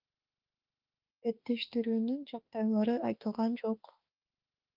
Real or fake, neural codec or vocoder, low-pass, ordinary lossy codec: fake; autoencoder, 48 kHz, 32 numbers a frame, DAC-VAE, trained on Japanese speech; 5.4 kHz; Opus, 32 kbps